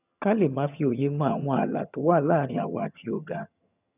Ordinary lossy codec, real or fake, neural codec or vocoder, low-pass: none; fake; vocoder, 22.05 kHz, 80 mel bands, HiFi-GAN; 3.6 kHz